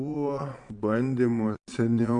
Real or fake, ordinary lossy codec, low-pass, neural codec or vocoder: fake; MP3, 48 kbps; 9.9 kHz; vocoder, 22.05 kHz, 80 mel bands, Vocos